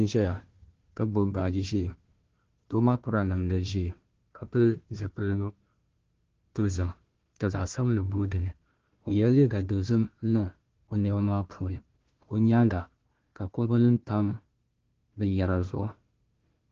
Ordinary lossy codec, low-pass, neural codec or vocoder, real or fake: Opus, 16 kbps; 7.2 kHz; codec, 16 kHz, 1 kbps, FunCodec, trained on Chinese and English, 50 frames a second; fake